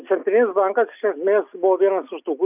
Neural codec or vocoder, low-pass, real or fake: none; 3.6 kHz; real